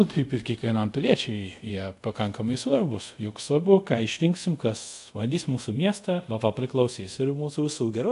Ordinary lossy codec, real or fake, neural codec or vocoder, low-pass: AAC, 64 kbps; fake; codec, 24 kHz, 0.5 kbps, DualCodec; 10.8 kHz